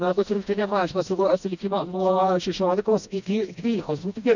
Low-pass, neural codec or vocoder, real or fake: 7.2 kHz; codec, 16 kHz, 1 kbps, FreqCodec, smaller model; fake